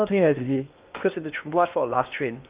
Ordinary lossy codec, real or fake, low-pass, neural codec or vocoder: Opus, 24 kbps; fake; 3.6 kHz; codec, 16 kHz in and 24 kHz out, 0.8 kbps, FocalCodec, streaming, 65536 codes